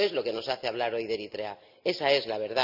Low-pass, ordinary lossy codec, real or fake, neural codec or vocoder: 5.4 kHz; none; real; none